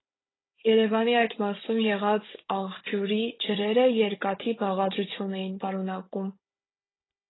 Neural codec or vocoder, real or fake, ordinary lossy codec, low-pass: codec, 16 kHz, 16 kbps, FunCodec, trained on Chinese and English, 50 frames a second; fake; AAC, 16 kbps; 7.2 kHz